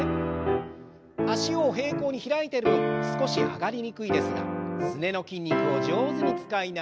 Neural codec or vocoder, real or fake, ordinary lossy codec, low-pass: none; real; none; none